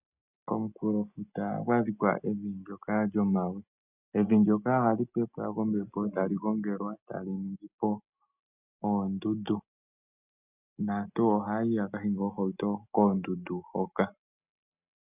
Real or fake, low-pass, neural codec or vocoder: real; 3.6 kHz; none